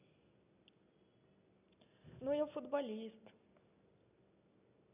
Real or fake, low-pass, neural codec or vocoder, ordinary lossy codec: real; 3.6 kHz; none; none